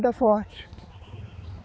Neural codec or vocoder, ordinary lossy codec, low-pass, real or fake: codec, 16 kHz, 4 kbps, X-Codec, HuBERT features, trained on balanced general audio; none; none; fake